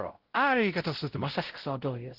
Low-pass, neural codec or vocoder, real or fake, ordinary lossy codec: 5.4 kHz; codec, 16 kHz, 0.5 kbps, X-Codec, WavLM features, trained on Multilingual LibriSpeech; fake; Opus, 16 kbps